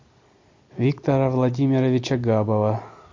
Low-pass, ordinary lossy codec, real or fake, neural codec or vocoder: 7.2 kHz; MP3, 48 kbps; real; none